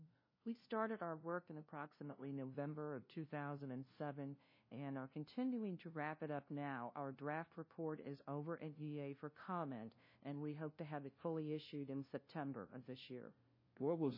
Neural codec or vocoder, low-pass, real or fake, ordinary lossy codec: codec, 16 kHz, 0.5 kbps, FunCodec, trained on LibriTTS, 25 frames a second; 5.4 kHz; fake; MP3, 24 kbps